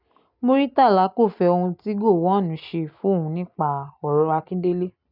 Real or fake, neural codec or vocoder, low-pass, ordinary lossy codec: real; none; 5.4 kHz; none